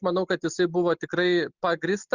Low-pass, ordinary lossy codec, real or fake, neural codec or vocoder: 7.2 kHz; Opus, 64 kbps; real; none